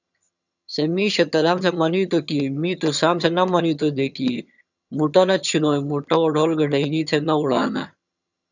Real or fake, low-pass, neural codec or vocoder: fake; 7.2 kHz; vocoder, 22.05 kHz, 80 mel bands, HiFi-GAN